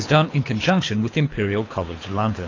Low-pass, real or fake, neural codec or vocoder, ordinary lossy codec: 7.2 kHz; fake; codec, 24 kHz, 6 kbps, HILCodec; AAC, 32 kbps